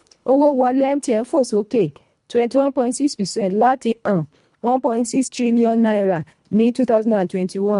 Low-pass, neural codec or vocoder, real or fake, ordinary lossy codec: 10.8 kHz; codec, 24 kHz, 1.5 kbps, HILCodec; fake; MP3, 64 kbps